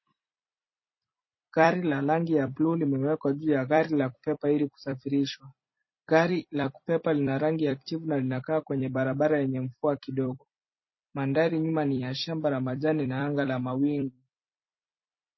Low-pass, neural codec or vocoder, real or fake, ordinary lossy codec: 7.2 kHz; vocoder, 44.1 kHz, 128 mel bands every 256 samples, BigVGAN v2; fake; MP3, 24 kbps